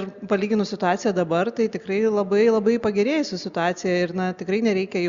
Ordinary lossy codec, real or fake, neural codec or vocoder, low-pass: Opus, 64 kbps; real; none; 7.2 kHz